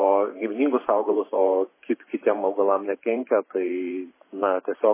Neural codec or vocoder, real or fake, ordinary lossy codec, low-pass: vocoder, 44.1 kHz, 128 mel bands every 512 samples, BigVGAN v2; fake; MP3, 16 kbps; 3.6 kHz